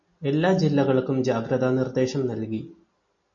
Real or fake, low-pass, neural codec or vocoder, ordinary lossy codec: real; 7.2 kHz; none; MP3, 32 kbps